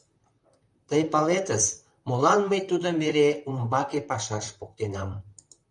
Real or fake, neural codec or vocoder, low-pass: fake; vocoder, 44.1 kHz, 128 mel bands, Pupu-Vocoder; 10.8 kHz